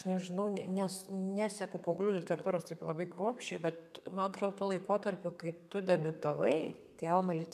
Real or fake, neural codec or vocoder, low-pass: fake; codec, 32 kHz, 1.9 kbps, SNAC; 14.4 kHz